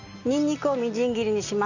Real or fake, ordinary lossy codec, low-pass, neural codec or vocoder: real; none; 7.2 kHz; none